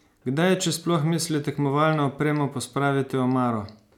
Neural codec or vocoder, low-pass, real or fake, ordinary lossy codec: none; 19.8 kHz; real; none